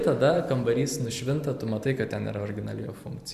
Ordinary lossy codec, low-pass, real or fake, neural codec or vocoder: Opus, 64 kbps; 14.4 kHz; fake; vocoder, 44.1 kHz, 128 mel bands every 512 samples, BigVGAN v2